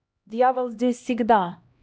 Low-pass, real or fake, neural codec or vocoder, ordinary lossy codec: none; fake; codec, 16 kHz, 1 kbps, X-Codec, HuBERT features, trained on LibriSpeech; none